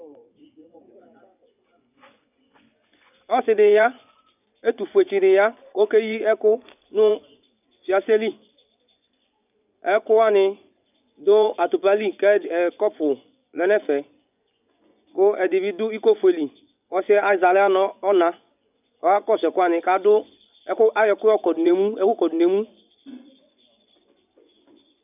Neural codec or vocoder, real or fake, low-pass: vocoder, 24 kHz, 100 mel bands, Vocos; fake; 3.6 kHz